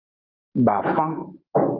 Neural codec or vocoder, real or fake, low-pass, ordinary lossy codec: none; real; 5.4 kHz; Opus, 24 kbps